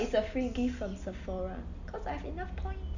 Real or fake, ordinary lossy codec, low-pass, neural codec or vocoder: real; none; 7.2 kHz; none